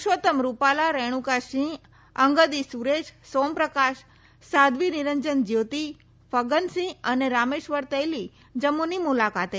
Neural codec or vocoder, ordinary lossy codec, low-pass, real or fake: none; none; none; real